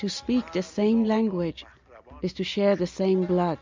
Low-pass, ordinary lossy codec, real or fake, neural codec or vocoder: 7.2 kHz; MP3, 64 kbps; real; none